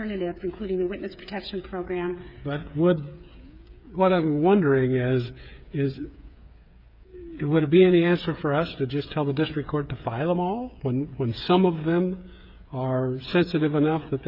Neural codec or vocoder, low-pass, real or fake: codec, 16 kHz, 8 kbps, FreqCodec, smaller model; 5.4 kHz; fake